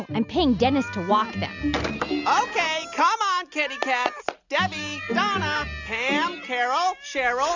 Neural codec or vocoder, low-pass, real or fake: none; 7.2 kHz; real